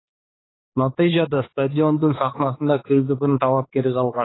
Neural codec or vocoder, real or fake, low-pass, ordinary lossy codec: codec, 16 kHz, 2 kbps, X-Codec, HuBERT features, trained on balanced general audio; fake; 7.2 kHz; AAC, 16 kbps